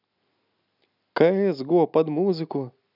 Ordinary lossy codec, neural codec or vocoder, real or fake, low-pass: none; none; real; 5.4 kHz